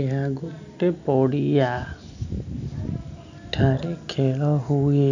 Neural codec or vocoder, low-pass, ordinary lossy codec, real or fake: none; 7.2 kHz; none; real